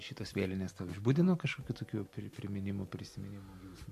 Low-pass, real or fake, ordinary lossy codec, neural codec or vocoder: 14.4 kHz; real; MP3, 64 kbps; none